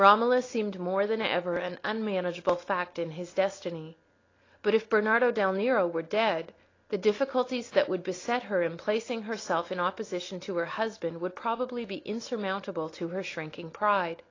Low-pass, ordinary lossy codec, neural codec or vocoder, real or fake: 7.2 kHz; AAC, 32 kbps; none; real